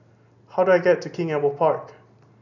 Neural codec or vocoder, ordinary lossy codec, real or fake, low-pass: none; none; real; 7.2 kHz